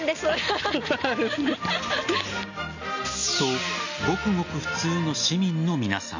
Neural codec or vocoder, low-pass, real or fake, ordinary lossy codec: none; 7.2 kHz; real; none